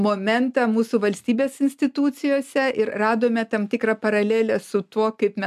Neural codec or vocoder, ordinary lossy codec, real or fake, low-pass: none; MP3, 96 kbps; real; 14.4 kHz